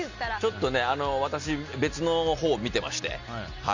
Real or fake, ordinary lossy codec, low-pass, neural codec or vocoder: real; Opus, 64 kbps; 7.2 kHz; none